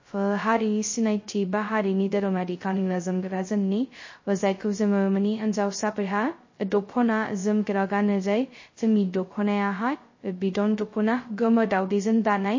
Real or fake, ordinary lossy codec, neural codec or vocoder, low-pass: fake; MP3, 32 kbps; codec, 16 kHz, 0.2 kbps, FocalCodec; 7.2 kHz